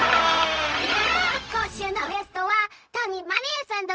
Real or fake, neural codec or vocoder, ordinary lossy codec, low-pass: fake; codec, 16 kHz, 0.4 kbps, LongCat-Audio-Codec; none; none